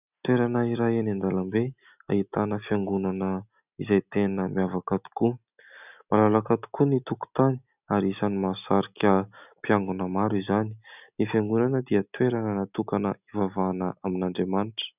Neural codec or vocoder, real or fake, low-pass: none; real; 3.6 kHz